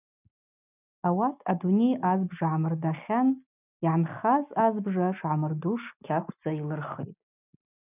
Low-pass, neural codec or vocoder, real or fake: 3.6 kHz; none; real